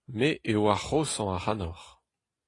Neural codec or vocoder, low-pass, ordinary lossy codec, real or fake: none; 10.8 kHz; AAC, 32 kbps; real